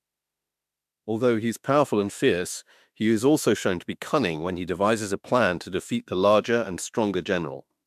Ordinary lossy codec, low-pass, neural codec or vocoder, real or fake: MP3, 96 kbps; 14.4 kHz; autoencoder, 48 kHz, 32 numbers a frame, DAC-VAE, trained on Japanese speech; fake